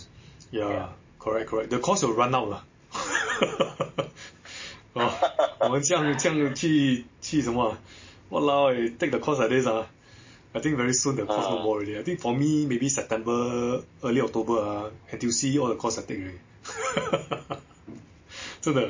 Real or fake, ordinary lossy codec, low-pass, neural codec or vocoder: real; MP3, 32 kbps; 7.2 kHz; none